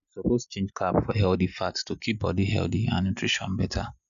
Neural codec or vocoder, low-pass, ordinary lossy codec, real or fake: none; 7.2 kHz; none; real